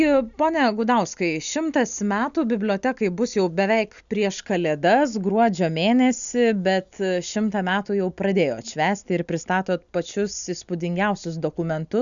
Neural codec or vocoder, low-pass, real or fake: none; 7.2 kHz; real